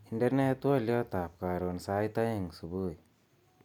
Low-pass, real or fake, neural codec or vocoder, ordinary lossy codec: 19.8 kHz; real; none; none